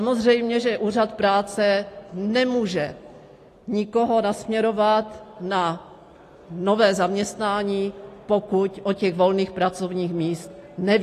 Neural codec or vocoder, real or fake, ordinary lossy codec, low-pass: none; real; AAC, 48 kbps; 14.4 kHz